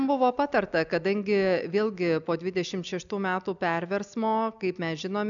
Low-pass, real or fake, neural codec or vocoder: 7.2 kHz; real; none